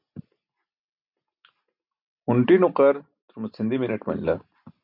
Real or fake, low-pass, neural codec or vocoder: real; 5.4 kHz; none